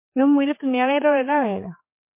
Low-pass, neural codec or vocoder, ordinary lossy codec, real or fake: 3.6 kHz; codec, 16 kHz, 4 kbps, X-Codec, HuBERT features, trained on general audio; MP3, 24 kbps; fake